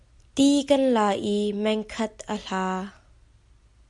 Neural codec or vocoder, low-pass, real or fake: none; 10.8 kHz; real